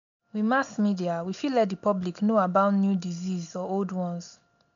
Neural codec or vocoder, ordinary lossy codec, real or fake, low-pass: none; none; real; 7.2 kHz